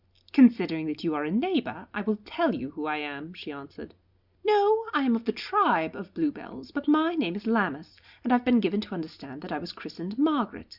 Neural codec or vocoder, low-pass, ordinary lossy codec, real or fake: none; 5.4 kHz; Opus, 64 kbps; real